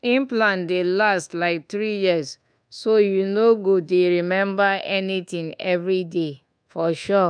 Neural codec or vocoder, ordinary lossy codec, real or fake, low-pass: codec, 24 kHz, 1.2 kbps, DualCodec; none; fake; 9.9 kHz